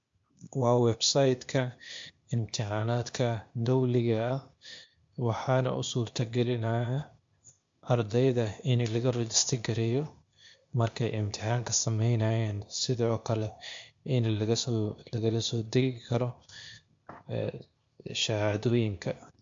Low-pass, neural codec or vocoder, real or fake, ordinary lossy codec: 7.2 kHz; codec, 16 kHz, 0.8 kbps, ZipCodec; fake; MP3, 48 kbps